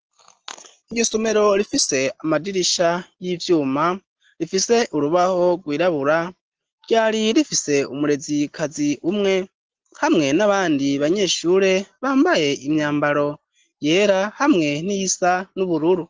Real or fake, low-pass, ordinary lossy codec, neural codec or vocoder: real; 7.2 kHz; Opus, 16 kbps; none